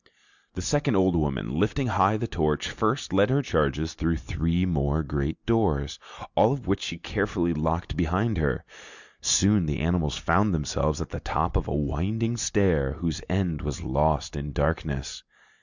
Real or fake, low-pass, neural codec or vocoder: real; 7.2 kHz; none